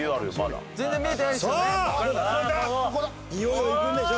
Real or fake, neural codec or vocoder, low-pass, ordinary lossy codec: real; none; none; none